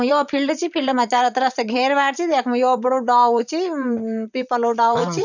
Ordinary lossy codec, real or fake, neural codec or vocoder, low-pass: none; fake; vocoder, 44.1 kHz, 128 mel bands, Pupu-Vocoder; 7.2 kHz